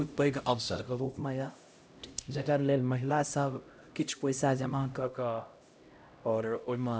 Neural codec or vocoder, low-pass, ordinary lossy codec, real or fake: codec, 16 kHz, 0.5 kbps, X-Codec, HuBERT features, trained on LibriSpeech; none; none; fake